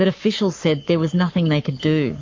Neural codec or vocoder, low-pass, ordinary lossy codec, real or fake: codec, 44.1 kHz, 7.8 kbps, Pupu-Codec; 7.2 kHz; MP3, 48 kbps; fake